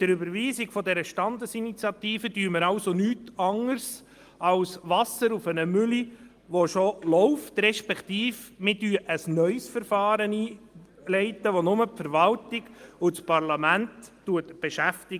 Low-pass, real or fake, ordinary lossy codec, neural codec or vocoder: 14.4 kHz; real; Opus, 32 kbps; none